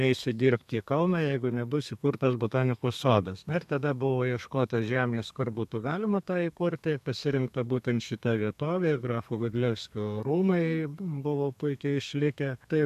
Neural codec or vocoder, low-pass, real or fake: codec, 32 kHz, 1.9 kbps, SNAC; 14.4 kHz; fake